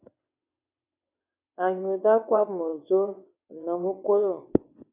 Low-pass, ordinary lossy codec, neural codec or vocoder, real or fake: 3.6 kHz; AAC, 32 kbps; vocoder, 22.05 kHz, 80 mel bands, WaveNeXt; fake